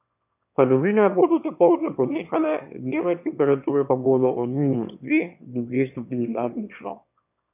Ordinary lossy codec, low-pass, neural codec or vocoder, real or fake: none; 3.6 kHz; autoencoder, 22.05 kHz, a latent of 192 numbers a frame, VITS, trained on one speaker; fake